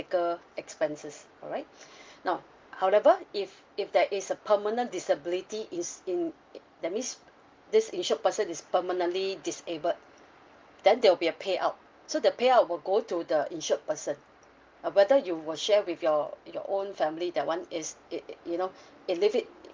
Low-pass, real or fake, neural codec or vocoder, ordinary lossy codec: 7.2 kHz; real; none; Opus, 32 kbps